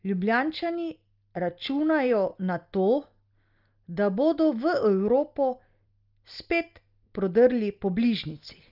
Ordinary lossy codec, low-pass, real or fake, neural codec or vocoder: Opus, 32 kbps; 5.4 kHz; real; none